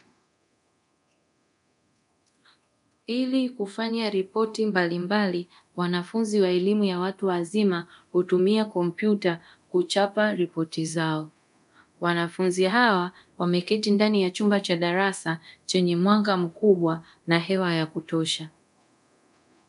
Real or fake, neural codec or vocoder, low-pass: fake; codec, 24 kHz, 0.9 kbps, DualCodec; 10.8 kHz